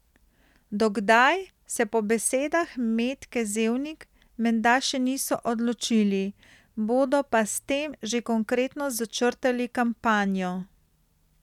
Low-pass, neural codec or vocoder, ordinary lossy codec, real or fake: 19.8 kHz; none; none; real